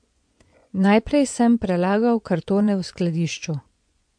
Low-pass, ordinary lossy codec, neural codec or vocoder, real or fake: 9.9 kHz; MP3, 64 kbps; vocoder, 24 kHz, 100 mel bands, Vocos; fake